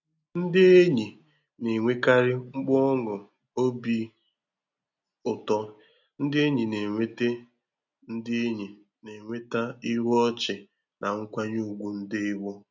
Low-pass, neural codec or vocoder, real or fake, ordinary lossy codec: 7.2 kHz; none; real; none